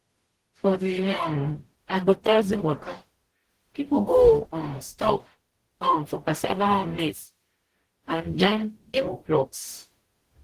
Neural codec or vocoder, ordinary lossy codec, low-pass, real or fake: codec, 44.1 kHz, 0.9 kbps, DAC; Opus, 16 kbps; 14.4 kHz; fake